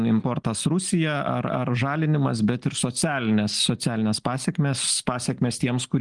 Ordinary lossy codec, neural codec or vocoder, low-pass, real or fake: Opus, 24 kbps; none; 10.8 kHz; real